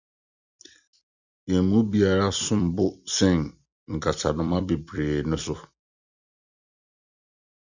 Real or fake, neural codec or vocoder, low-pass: fake; vocoder, 44.1 kHz, 128 mel bands every 256 samples, BigVGAN v2; 7.2 kHz